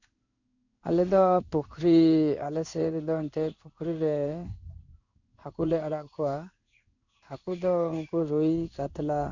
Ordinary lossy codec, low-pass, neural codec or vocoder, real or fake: none; 7.2 kHz; codec, 16 kHz in and 24 kHz out, 1 kbps, XY-Tokenizer; fake